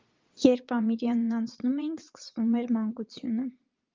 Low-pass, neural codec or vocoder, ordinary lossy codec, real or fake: 7.2 kHz; vocoder, 22.05 kHz, 80 mel bands, Vocos; Opus, 32 kbps; fake